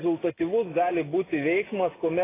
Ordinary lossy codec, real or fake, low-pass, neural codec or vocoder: AAC, 16 kbps; real; 3.6 kHz; none